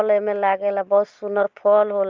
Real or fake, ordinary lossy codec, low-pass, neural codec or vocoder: real; Opus, 32 kbps; 7.2 kHz; none